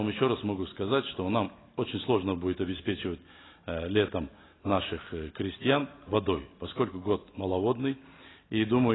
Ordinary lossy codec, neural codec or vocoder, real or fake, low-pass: AAC, 16 kbps; none; real; 7.2 kHz